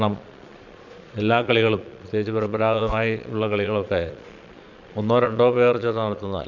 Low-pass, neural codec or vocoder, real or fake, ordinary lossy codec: 7.2 kHz; vocoder, 22.05 kHz, 80 mel bands, Vocos; fake; none